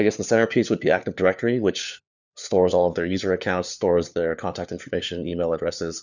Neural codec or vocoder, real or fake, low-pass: codec, 16 kHz, 4 kbps, FunCodec, trained on LibriTTS, 50 frames a second; fake; 7.2 kHz